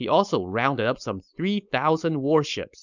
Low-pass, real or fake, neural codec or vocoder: 7.2 kHz; fake; codec, 16 kHz, 4.8 kbps, FACodec